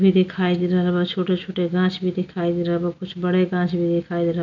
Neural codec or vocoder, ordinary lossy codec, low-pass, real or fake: none; none; 7.2 kHz; real